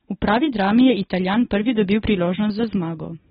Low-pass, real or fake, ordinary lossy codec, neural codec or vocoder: 19.8 kHz; real; AAC, 16 kbps; none